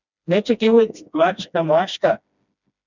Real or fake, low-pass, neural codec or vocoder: fake; 7.2 kHz; codec, 16 kHz, 1 kbps, FreqCodec, smaller model